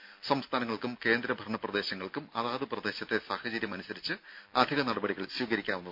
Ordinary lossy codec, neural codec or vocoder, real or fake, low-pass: MP3, 48 kbps; none; real; 5.4 kHz